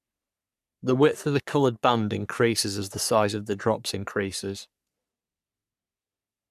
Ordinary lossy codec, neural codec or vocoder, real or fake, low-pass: none; codec, 44.1 kHz, 3.4 kbps, Pupu-Codec; fake; 14.4 kHz